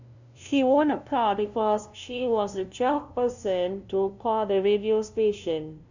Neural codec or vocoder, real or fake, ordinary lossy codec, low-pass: codec, 16 kHz, 0.5 kbps, FunCodec, trained on LibriTTS, 25 frames a second; fake; none; 7.2 kHz